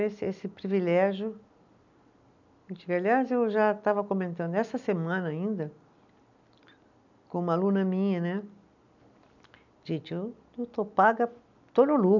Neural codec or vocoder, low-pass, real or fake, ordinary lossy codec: none; 7.2 kHz; real; none